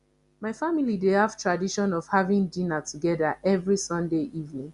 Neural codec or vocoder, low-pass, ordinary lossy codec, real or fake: none; 10.8 kHz; Opus, 64 kbps; real